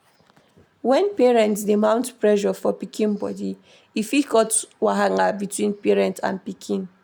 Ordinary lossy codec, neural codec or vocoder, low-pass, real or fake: none; none; none; real